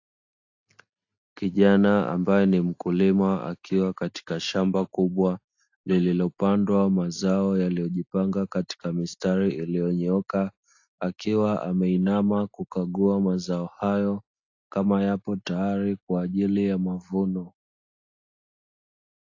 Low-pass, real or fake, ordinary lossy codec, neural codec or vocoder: 7.2 kHz; real; AAC, 48 kbps; none